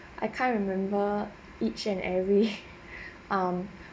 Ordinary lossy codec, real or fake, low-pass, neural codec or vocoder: none; real; none; none